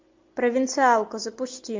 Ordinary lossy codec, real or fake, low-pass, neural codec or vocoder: MP3, 48 kbps; real; 7.2 kHz; none